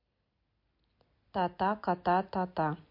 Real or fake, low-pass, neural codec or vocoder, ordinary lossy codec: real; 5.4 kHz; none; MP3, 32 kbps